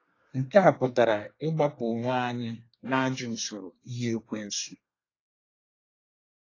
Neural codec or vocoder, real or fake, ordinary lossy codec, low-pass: codec, 24 kHz, 1 kbps, SNAC; fake; AAC, 32 kbps; 7.2 kHz